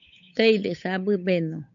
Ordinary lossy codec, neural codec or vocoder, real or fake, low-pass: AAC, 64 kbps; codec, 16 kHz, 8 kbps, FunCodec, trained on Chinese and English, 25 frames a second; fake; 7.2 kHz